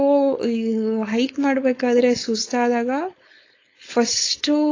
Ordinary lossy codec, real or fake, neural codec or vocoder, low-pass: AAC, 32 kbps; fake; codec, 16 kHz, 4.8 kbps, FACodec; 7.2 kHz